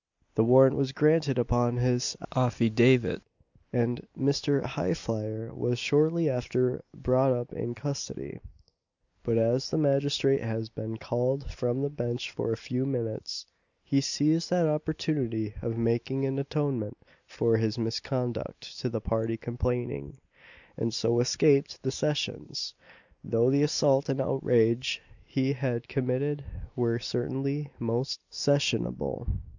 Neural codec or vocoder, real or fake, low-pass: none; real; 7.2 kHz